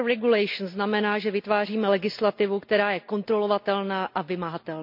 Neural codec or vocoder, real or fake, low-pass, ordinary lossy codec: none; real; 5.4 kHz; MP3, 48 kbps